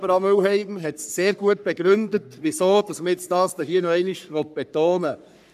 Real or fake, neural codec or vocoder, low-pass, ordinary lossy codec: fake; codec, 44.1 kHz, 3.4 kbps, Pupu-Codec; 14.4 kHz; none